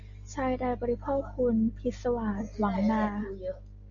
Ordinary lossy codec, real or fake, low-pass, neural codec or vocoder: MP3, 64 kbps; real; 7.2 kHz; none